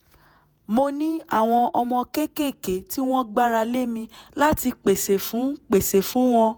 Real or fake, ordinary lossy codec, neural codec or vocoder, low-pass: fake; none; vocoder, 48 kHz, 128 mel bands, Vocos; none